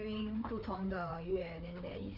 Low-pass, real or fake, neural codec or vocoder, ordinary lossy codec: 5.4 kHz; fake; codec, 16 kHz, 4 kbps, FreqCodec, larger model; none